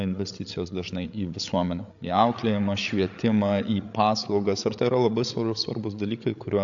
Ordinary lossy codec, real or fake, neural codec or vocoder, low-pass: AAC, 64 kbps; fake; codec, 16 kHz, 8 kbps, FreqCodec, larger model; 7.2 kHz